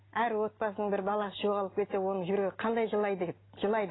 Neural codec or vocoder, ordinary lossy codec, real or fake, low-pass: none; AAC, 16 kbps; real; 7.2 kHz